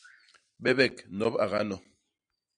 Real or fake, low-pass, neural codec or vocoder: real; 9.9 kHz; none